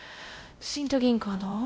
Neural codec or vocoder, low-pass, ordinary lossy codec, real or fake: codec, 16 kHz, 0.5 kbps, X-Codec, WavLM features, trained on Multilingual LibriSpeech; none; none; fake